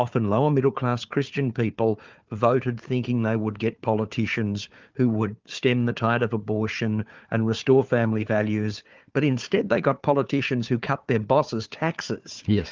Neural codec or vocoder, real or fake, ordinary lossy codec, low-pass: codec, 16 kHz, 4 kbps, FunCodec, trained on Chinese and English, 50 frames a second; fake; Opus, 24 kbps; 7.2 kHz